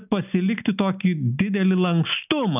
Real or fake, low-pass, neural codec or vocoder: real; 3.6 kHz; none